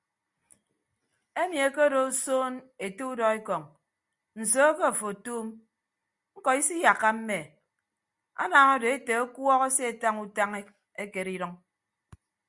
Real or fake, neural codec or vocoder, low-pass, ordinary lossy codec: real; none; 10.8 kHz; Opus, 64 kbps